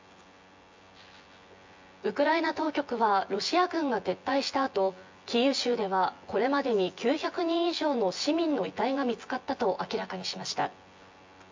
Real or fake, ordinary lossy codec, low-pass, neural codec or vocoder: fake; MP3, 48 kbps; 7.2 kHz; vocoder, 24 kHz, 100 mel bands, Vocos